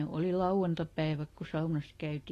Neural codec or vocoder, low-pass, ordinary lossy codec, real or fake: none; 14.4 kHz; AAC, 48 kbps; real